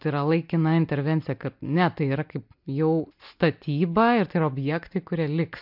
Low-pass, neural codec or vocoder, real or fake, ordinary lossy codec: 5.4 kHz; none; real; MP3, 48 kbps